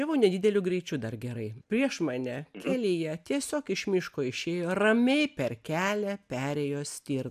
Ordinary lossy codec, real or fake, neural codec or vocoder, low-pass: AAC, 96 kbps; real; none; 14.4 kHz